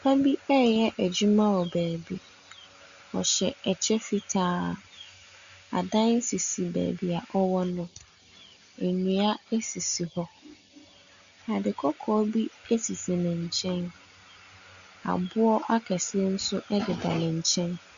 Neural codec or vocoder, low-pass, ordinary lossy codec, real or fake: none; 7.2 kHz; Opus, 64 kbps; real